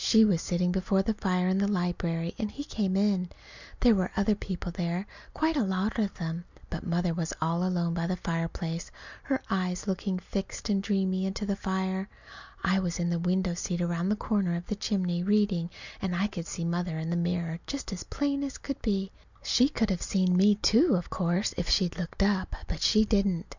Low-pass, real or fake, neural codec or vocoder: 7.2 kHz; real; none